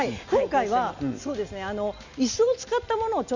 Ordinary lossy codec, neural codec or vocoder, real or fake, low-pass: Opus, 64 kbps; none; real; 7.2 kHz